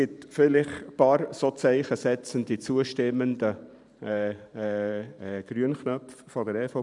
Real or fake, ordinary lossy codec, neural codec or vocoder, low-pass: fake; none; vocoder, 44.1 kHz, 128 mel bands every 512 samples, BigVGAN v2; 10.8 kHz